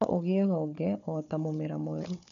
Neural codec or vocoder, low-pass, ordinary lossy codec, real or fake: codec, 16 kHz, 4 kbps, FunCodec, trained on Chinese and English, 50 frames a second; 7.2 kHz; MP3, 96 kbps; fake